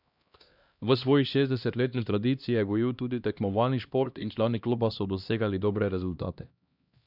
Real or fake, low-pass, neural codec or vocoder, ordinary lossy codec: fake; 5.4 kHz; codec, 16 kHz, 1 kbps, X-Codec, HuBERT features, trained on LibriSpeech; none